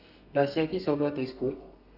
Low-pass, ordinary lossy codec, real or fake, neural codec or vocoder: 5.4 kHz; none; fake; codec, 32 kHz, 1.9 kbps, SNAC